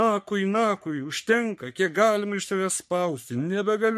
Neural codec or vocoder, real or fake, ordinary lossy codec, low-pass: codec, 44.1 kHz, 3.4 kbps, Pupu-Codec; fake; MP3, 64 kbps; 14.4 kHz